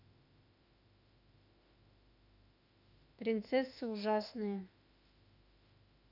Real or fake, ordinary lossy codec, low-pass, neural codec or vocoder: fake; none; 5.4 kHz; autoencoder, 48 kHz, 32 numbers a frame, DAC-VAE, trained on Japanese speech